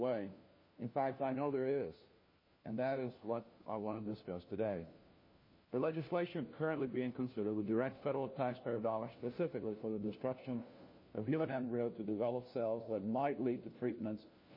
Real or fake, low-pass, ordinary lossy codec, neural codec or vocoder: fake; 5.4 kHz; MP3, 24 kbps; codec, 16 kHz, 1 kbps, FunCodec, trained on LibriTTS, 50 frames a second